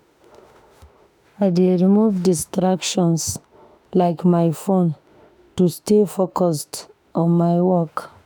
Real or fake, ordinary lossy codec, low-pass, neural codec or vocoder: fake; none; none; autoencoder, 48 kHz, 32 numbers a frame, DAC-VAE, trained on Japanese speech